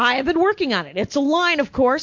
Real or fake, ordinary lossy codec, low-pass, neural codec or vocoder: real; MP3, 48 kbps; 7.2 kHz; none